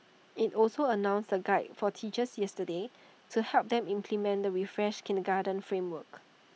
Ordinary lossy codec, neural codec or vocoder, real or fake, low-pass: none; none; real; none